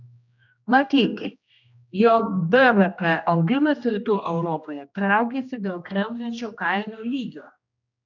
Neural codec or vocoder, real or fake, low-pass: codec, 16 kHz, 1 kbps, X-Codec, HuBERT features, trained on general audio; fake; 7.2 kHz